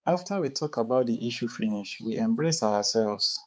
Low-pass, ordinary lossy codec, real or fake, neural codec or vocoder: none; none; fake; codec, 16 kHz, 4 kbps, X-Codec, HuBERT features, trained on balanced general audio